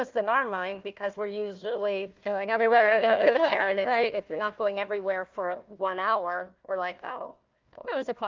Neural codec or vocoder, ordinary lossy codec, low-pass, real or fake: codec, 16 kHz, 1 kbps, FunCodec, trained on Chinese and English, 50 frames a second; Opus, 24 kbps; 7.2 kHz; fake